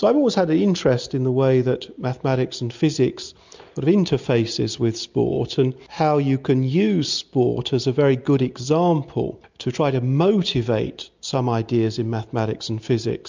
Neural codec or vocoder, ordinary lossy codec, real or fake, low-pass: none; MP3, 64 kbps; real; 7.2 kHz